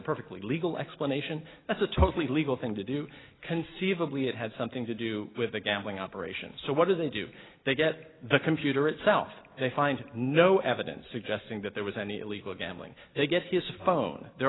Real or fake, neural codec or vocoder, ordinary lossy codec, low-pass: real; none; AAC, 16 kbps; 7.2 kHz